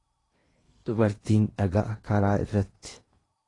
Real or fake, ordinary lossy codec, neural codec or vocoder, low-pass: fake; AAC, 32 kbps; codec, 16 kHz in and 24 kHz out, 0.8 kbps, FocalCodec, streaming, 65536 codes; 10.8 kHz